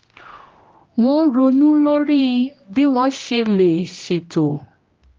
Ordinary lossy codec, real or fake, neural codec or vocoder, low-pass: Opus, 32 kbps; fake; codec, 16 kHz, 1 kbps, X-Codec, HuBERT features, trained on general audio; 7.2 kHz